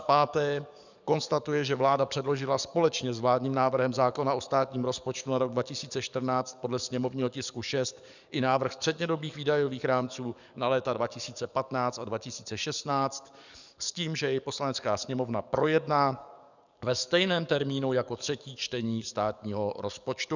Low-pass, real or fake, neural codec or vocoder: 7.2 kHz; fake; codec, 44.1 kHz, 7.8 kbps, DAC